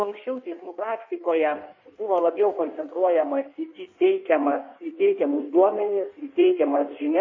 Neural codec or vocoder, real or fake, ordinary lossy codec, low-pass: codec, 16 kHz in and 24 kHz out, 1.1 kbps, FireRedTTS-2 codec; fake; MP3, 32 kbps; 7.2 kHz